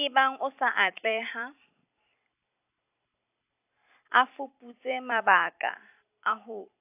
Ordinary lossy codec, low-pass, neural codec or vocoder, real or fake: AAC, 32 kbps; 3.6 kHz; vocoder, 44.1 kHz, 128 mel bands every 256 samples, BigVGAN v2; fake